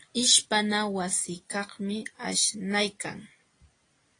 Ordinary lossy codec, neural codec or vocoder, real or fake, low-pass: AAC, 32 kbps; none; real; 9.9 kHz